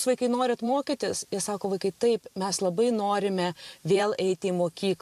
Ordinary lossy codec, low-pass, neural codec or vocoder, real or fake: AAC, 96 kbps; 14.4 kHz; vocoder, 44.1 kHz, 128 mel bands every 512 samples, BigVGAN v2; fake